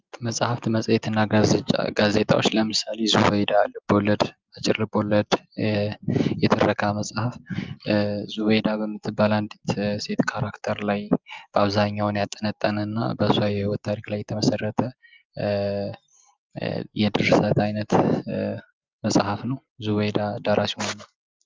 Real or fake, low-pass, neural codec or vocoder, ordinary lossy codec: real; 7.2 kHz; none; Opus, 32 kbps